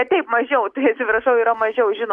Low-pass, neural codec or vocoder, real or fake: 10.8 kHz; none; real